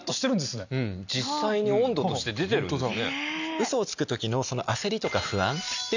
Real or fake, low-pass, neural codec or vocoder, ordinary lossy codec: real; 7.2 kHz; none; none